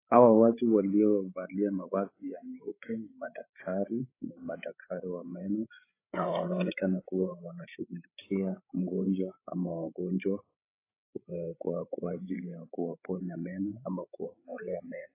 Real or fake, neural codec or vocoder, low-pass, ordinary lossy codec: fake; codec, 16 kHz, 8 kbps, FreqCodec, larger model; 3.6 kHz; AAC, 24 kbps